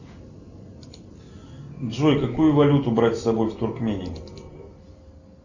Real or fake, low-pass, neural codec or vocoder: real; 7.2 kHz; none